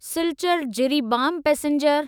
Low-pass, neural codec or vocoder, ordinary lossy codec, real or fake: none; autoencoder, 48 kHz, 128 numbers a frame, DAC-VAE, trained on Japanese speech; none; fake